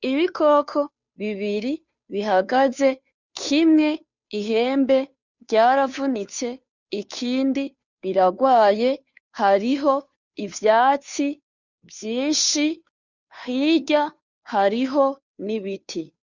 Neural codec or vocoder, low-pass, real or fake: codec, 16 kHz, 2 kbps, FunCodec, trained on Chinese and English, 25 frames a second; 7.2 kHz; fake